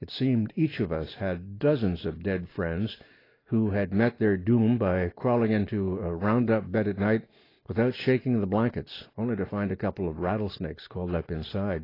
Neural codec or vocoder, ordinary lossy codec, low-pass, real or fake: none; AAC, 24 kbps; 5.4 kHz; real